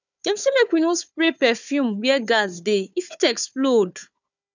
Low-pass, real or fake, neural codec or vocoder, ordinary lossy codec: 7.2 kHz; fake; codec, 16 kHz, 4 kbps, FunCodec, trained on Chinese and English, 50 frames a second; none